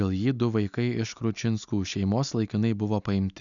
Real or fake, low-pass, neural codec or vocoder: real; 7.2 kHz; none